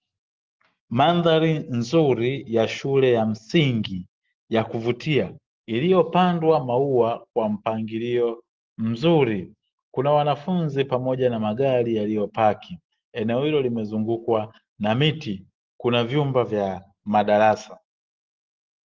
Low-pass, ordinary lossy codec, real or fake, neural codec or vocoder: 7.2 kHz; Opus, 16 kbps; real; none